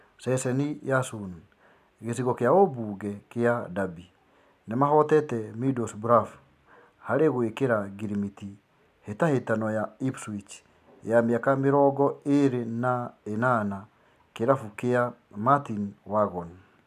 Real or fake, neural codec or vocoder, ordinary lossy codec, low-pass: real; none; AAC, 96 kbps; 14.4 kHz